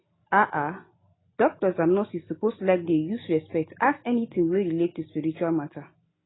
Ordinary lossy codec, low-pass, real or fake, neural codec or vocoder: AAC, 16 kbps; 7.2 kHz; real; none